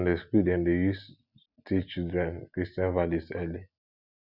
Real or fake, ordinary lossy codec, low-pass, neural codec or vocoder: real; none; 5.4 kHz; none